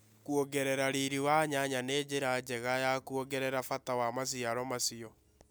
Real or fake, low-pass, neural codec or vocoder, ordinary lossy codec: real; none; none; none